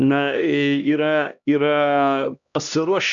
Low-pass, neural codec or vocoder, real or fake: 7.2 kHz; codec, 16 kHz, 1 kbps, X-Codec, HuBERT features, trained on LibriSpeech; fake